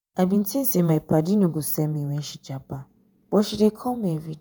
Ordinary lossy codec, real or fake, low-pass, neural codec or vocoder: none; fake; none; vocoder, 48 kHz, 128 mel bands, Vocos